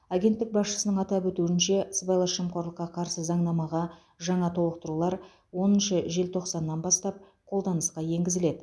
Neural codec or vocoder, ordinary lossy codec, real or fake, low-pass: none; none; real; none